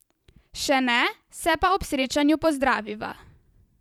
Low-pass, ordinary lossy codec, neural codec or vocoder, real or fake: 19.8 kHz; none; vocoder, 44.1 kHz, 128 mel bands every 512 samples, BigVGAN v2; fake